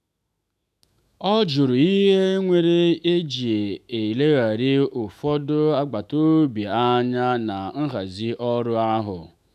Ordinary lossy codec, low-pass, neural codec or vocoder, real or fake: none; 14.4 kHz; autoencoder, 48 kHz, 128 numbers a frame, DAC-VAE, trained on Japanese speech; fake